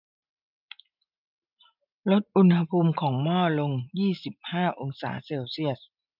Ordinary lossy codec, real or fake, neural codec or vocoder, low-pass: none; real; none; 5.4 kHz